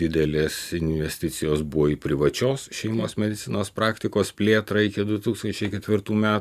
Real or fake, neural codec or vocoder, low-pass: fake; vocoder, 44.1 kHz, 128 mel bands every 512 samples, BigVGAN v2; 14.4 kHz